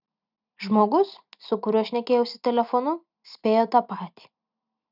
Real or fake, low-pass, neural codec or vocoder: real; 5.4 kHz; none